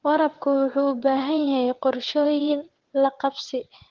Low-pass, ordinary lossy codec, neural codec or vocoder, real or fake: 7.2 kHz; Opus, 16 kbps; vocoder, 22.05 kHz, 80 mel bands, WaveNeXt; fake